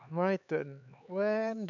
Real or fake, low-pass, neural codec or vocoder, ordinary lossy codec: fake; 7.2 kHz; codec, 16 kHz, 4 kbps, X-Codec, HuBERT features, trained on LibriSpeech; none